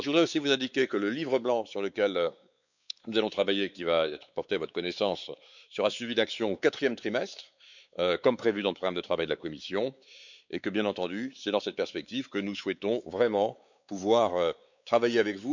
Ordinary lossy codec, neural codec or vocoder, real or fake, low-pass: none; codec, 16 kHz, 4 kbps, X-Codec, WavLM features, trained on Multilingual LibriSpeech; fake; 7.2 kHz